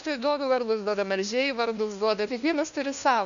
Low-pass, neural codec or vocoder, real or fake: 7.2 kHz; codec, 16 kHz, 1 kbps, FunCodec, trained on LibriTTS, 50 frames a second; fake